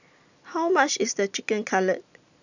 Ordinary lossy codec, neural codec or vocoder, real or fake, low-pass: none; none; real; 7.2 kHz